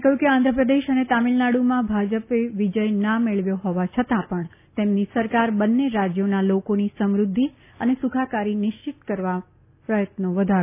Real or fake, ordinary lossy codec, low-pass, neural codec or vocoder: real; none; 3.6 kHz; none